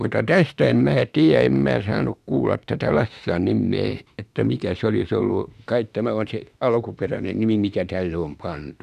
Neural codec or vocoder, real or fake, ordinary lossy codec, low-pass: autoencoder, 48 kHz, 32 numbers a frame, DAC-VAE, trained on Japanese speech; fake; none; 14.4 kHz